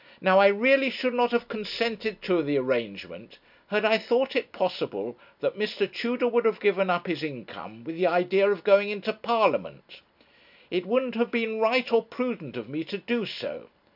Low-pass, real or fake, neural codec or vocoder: 5.4 kHz; real; none